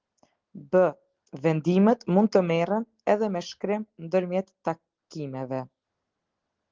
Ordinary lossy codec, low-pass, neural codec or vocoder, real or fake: Opus, 16 kbps; 7.2 kHz; none; real